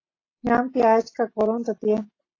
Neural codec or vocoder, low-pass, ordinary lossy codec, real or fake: none; 7.2 kHz; AAC, 32 kbps; real